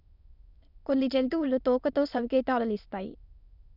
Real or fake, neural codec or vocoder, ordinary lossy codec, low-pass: fake; autoencoder, 22.05 kHz, a latent of 192 numbers a frame, VITS, trained on many speakers; none; 5.4 kHz